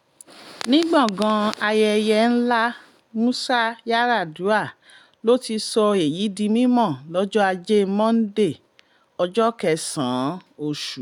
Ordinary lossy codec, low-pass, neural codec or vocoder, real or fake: none; 19.8 kHz; none; real